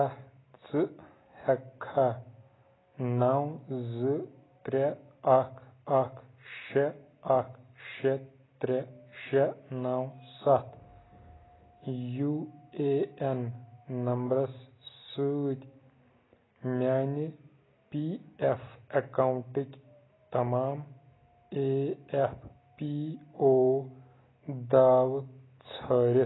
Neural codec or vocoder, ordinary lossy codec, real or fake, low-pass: none; AAC, 16 kbps; real; 7.2 kHz